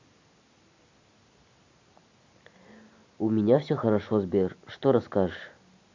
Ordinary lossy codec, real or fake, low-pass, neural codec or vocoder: MP3, 64 kbps; real; 7.2 kHz; none